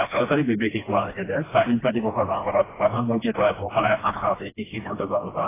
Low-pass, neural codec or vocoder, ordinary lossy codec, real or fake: 3.6 kHz; codec, 16 kHz, 1 kbps, FreqCodec, smaller model; AAC, 16 kbps; fake